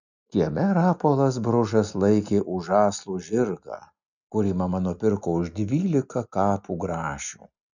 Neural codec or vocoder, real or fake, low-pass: none; real; 7.2 kHz